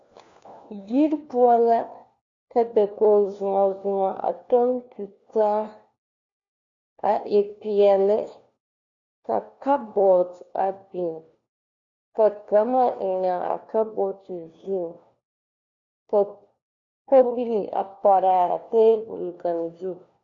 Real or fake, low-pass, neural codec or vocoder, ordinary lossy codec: fake; 7.2 kHz; codec, 16 kHz, 1 kbps, FunCodec, trained on LibriTTS, 50 frames a second; MP3, 64 kbps